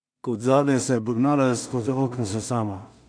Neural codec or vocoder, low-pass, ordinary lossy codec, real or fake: codec, 16 kHz in and 24 kHz out, 0.4 kbps, LongCat-Audio-Codec, two codebook decoder; 9.9 kHz; MP3, 64 kbps; fake